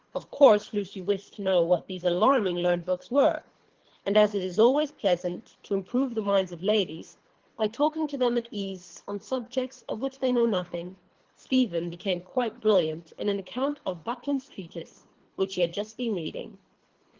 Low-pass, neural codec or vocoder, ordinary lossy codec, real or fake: 7.2 kHz; codec, 24 kHz, 3 kbps, HILCodec; Opus, 16 kbps; fake